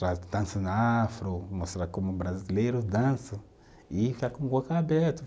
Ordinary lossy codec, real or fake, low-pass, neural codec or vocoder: none; real; none; none